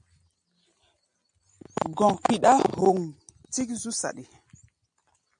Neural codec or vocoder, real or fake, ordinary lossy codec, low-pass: none; real; AAC, 64 kbps; 9.9 kHz